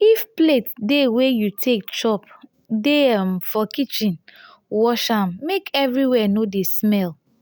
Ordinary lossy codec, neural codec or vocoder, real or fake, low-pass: none; none; real; none